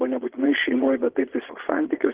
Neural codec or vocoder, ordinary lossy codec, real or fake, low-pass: vocoder, 22.05 kHz, 80 mel bands, Vocos; Opus, 16 kbps; fake; 3.6 kHz